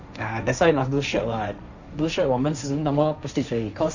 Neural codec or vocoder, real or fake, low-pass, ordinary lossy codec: codec, 16 kHz, 1.1 kbps, Voila-Tokenizer; fake; 7.2 kHz; none